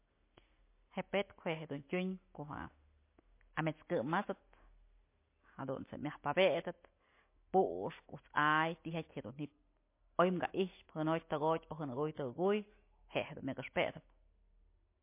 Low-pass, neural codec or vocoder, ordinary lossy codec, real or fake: 3.6 kHz; none; MP3, 24 kbps; real